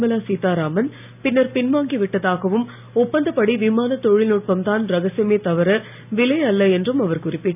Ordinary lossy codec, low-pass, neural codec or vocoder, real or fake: none; 3.6 kHz; none; real